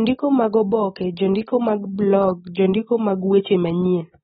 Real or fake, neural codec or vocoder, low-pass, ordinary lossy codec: real; none; 19.8 kHz; AAC, 16 kbps